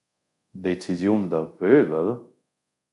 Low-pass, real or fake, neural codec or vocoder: 10.8 kHz; fake; codec, 24 kHz, 0.5 kbps, DualCodec